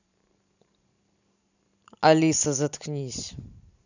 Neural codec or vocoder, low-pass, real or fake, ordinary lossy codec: vocoder, 44.1 kHz, 128 mel bands every 512 samples, BigVGAN v2; 7.2 kHz; fake; none